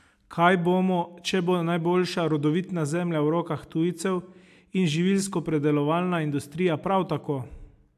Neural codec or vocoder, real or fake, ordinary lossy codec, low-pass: none; real; AAC, 96 kbps; 14.4 kHz